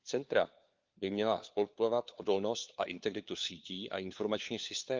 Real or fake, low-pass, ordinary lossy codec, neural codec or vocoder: fake; 7.2 kHz; Opus, 32 kbps; codec, 16 kHz, 4 kbps, FunCodec, trained on LibriTTS, 50 frames a second